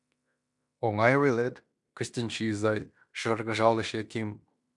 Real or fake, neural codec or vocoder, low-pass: fake; codec, 16 kHz in and 24 kHz out, 0.9 kbps, LongCat-Audio-Codec, fine tuned four codebook decoder; 10.8 kHz